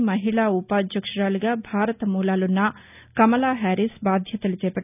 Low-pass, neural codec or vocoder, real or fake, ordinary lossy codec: 3.6 kHz; none; real; none